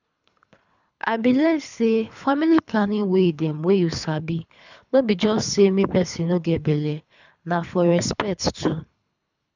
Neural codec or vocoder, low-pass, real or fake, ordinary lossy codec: codec, 24 kHz, 3 kbps, HILCodec; 7.2 kHz; fake; none